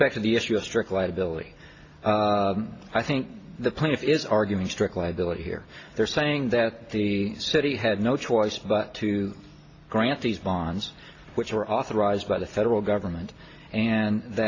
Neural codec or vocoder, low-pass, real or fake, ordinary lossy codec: none; 7.2 kHz; real; AAC, 32 kbps